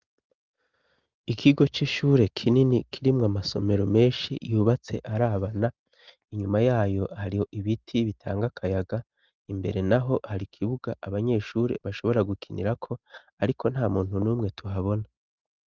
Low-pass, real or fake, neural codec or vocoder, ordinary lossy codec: 7.2 kHz; real; none; Opus, 24 kbps